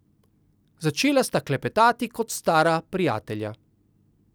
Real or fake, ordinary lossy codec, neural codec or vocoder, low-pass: real; none; none; none